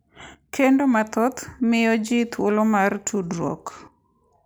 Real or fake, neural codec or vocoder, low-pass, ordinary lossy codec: real; none; none; none